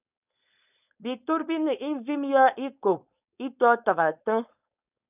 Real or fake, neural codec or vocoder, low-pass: fake; codec, 16 kHz, 4.8 kbps, FACodec; 3.6 kHz